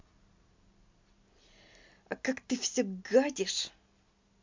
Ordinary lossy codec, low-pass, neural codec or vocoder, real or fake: MP3, 64 kbps; 7.2 kHz; none; real